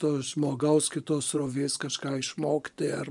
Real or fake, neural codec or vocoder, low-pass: fake; vocoder, 24 kHz, 100 mel bands, Vocos; 10.8 kHz